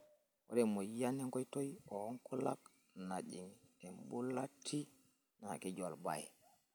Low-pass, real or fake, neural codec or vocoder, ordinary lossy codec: none; real; none; none